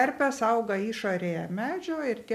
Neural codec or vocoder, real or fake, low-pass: none; real; 14.4 kHz